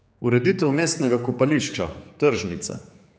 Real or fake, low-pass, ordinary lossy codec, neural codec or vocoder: fake; none; none; codec, 16 kHz, 4 kbps, X-Codec, HuBERT features, trained on general audio